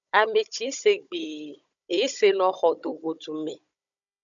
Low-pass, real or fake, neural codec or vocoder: 7.2 kHz; fake; codec, 16 kHz, 16 kbps, FunCodec, trained on Chinese and English, 50 frames a second